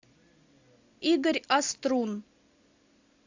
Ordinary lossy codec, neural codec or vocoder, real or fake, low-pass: AAC, 48 kbps; none; real; 7.2 kHz